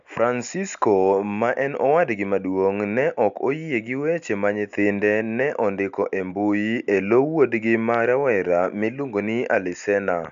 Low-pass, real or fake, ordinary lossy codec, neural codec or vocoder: 7.2 kHz; real; AAC, 96 kbps; none